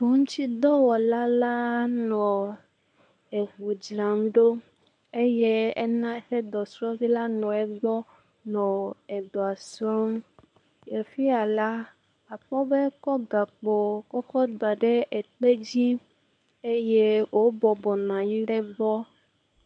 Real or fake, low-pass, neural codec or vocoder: fake; 10.8 kHz; codec, 24 kHz, 0.9 kbps, WavTokenizer, medium speech release version 1